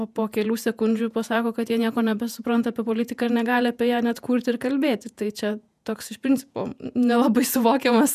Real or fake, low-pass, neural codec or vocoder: fake; 14.4 kHz; vocoder, 48 kHz, 128 mel bands, Vocos